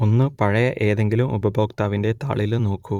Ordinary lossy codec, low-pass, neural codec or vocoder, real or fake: Opus, 64 kbps; 19.8 kHz; vocoder, 44.1 kHz, 128 mel bands every 256 samples, BigVGAN v2; fake